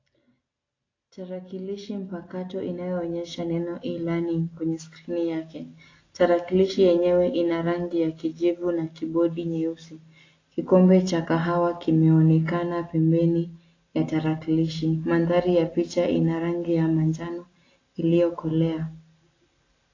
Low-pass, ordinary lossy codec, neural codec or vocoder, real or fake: 7.2 kHz; AAC, 32 kbps; none; real